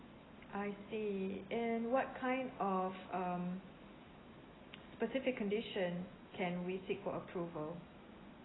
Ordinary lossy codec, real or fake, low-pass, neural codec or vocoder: AAC, 16 kbps; real; 7.2 kHz; none